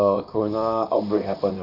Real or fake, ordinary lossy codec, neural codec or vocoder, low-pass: fake; none; vocoder, 44.1 kHz, 128 mel bands, Pupu-Vocoder; 5.4 kHz